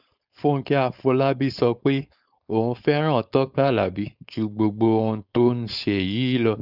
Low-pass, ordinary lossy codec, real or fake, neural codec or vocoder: 5.4 kHz; none; fake; codec, 16 kHz, 4.8 kbps, FACodec